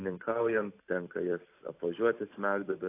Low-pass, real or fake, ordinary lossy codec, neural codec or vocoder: 3.6 kHz; fake; AAC, 24 kbps; vocoder, 44.1 kHz, 128 mel bands every 256 samples, BigVGAN v2